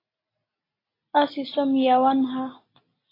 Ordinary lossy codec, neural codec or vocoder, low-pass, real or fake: AAC, 24 kbps; none; 5.4 kHz; real